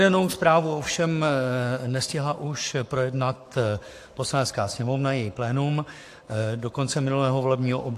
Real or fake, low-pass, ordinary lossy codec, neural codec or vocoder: fake; 14.4 kHz; AAC, 64 kbps; codec, 44.1 kHz, 7.8 kbps, Pupu-Codec